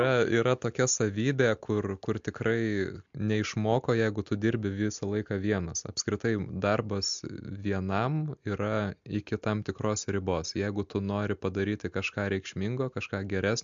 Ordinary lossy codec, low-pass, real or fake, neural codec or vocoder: MP3, 64 kbps; 7.2 kHz; real; none